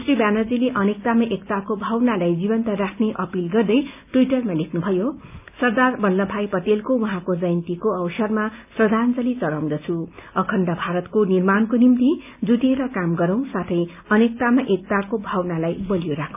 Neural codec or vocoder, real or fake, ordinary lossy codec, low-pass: none; real; none; 3.6 kHz